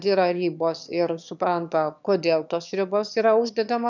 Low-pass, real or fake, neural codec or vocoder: 7.2 kHz; fake; autoencoder, 22.05 kHz, a latent of 192 numbers a frame, VITS, trained on one speaker